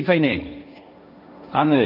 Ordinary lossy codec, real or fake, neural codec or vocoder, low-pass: none; fake; codec, 16 kHz, 1.1 kbps, Voila-Tokenizer; 5.4 kHz